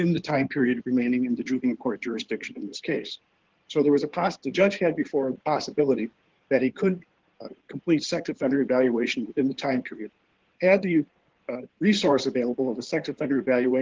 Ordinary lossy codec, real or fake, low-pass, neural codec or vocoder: Opus, 16 kbps; fake; 7.2 kHz; codec, 16 kHz in and 24 kHz out, 2.2 kbps, FireRedTTS-2 codec